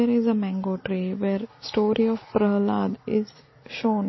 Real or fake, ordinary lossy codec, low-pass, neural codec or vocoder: real; MP3, 24 kbps; 7.2 kHz; none